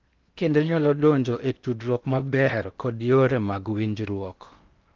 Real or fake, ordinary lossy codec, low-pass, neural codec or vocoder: fake; Opus, 24 kbps; 7.2 kHz; codec, 16 kHz in and 24 kHz out, 0.8 kbps, FocalCodec, streaming, 65536 codes